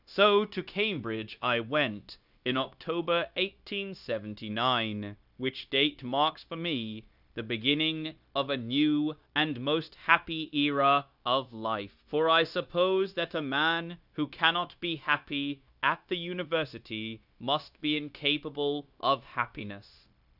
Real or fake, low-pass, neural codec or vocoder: fake; 5.4 kHz; codec, 16 kHz, 0.9 kbps, LongCat-Audio-Codec